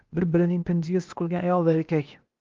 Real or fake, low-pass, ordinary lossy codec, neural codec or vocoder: fake; 7.2 kHz; Opus, 32 kbps; codec, 16 kHz, 0.8 kbps, ZipCodec